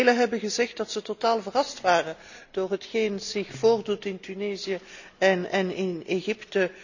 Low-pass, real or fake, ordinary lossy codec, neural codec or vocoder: 7.2 kHz; real; none; none